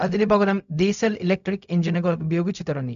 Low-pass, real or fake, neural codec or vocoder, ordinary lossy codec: 7.2 kHz; fake; codec, 16 kHz, 0.4 kbps, LongCat-Audio-Codec; AAC, 96 kbps